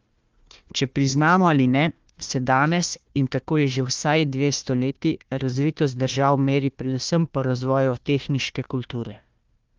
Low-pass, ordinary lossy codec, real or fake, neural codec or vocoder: 7.2 kHz; Opus, 32 kbps; fake; codec, 16 kHz, 1 kbps, FunCodec, trained on Chinese and English, 50 frames a second